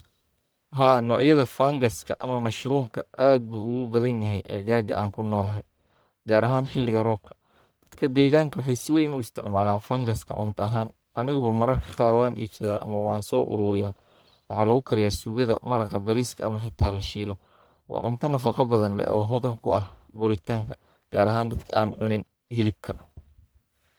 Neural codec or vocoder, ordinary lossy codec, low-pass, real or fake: codec, 44.1 kHz, 1.7 kbps, Pupu-Codec; none; none; fake